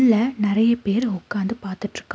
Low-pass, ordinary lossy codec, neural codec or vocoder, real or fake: none; none; none; real